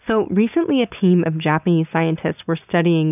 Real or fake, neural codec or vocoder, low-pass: real; none; 3.6 kHz